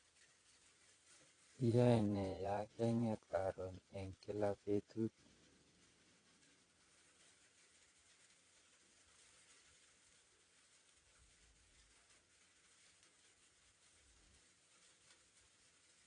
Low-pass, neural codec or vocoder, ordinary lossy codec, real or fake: 9.9 kHz; vocoder, 22.05 kHz, 80 mel bands, Vocos; AAC, 32 kbps; fake